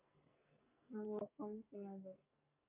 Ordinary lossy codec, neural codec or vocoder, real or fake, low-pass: MP3, 24 kbps; codec, 44.1 kHz, 2.6 kbps, SNAC; fake; 3.6 kHz